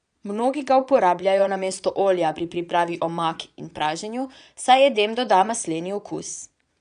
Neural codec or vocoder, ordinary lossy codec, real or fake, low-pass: vocoder, 22.05 kHz, 80 mel bands, Vocos; none; fake; 9.9 kHz